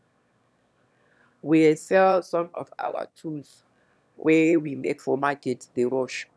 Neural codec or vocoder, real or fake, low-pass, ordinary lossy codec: autoencoder, 22.05 kHz, a latent of 192 numbers a frame, VITS, trained on one speaker; fake; none; none